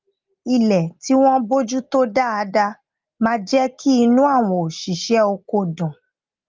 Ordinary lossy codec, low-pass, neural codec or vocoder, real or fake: Opus, 32 kbps; 7.2 kHz; none; real